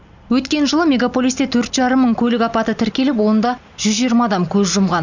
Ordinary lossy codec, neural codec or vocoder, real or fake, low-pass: none; none; real; 7.2 kHz